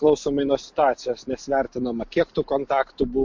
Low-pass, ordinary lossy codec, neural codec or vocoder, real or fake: 7.2 kHz; MP3, 48 kbps; none; real